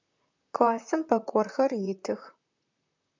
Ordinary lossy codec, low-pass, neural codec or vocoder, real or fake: MP3, 64 kbps; 7.2 kHz; vocoder, 44.1 kHz, 128 mel bands, Pupu-Vocoder; fake